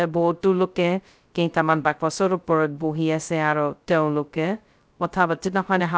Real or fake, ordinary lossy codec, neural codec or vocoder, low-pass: fake; none; codec, 16 kHz, 0.2 kbps, FocalCodec; none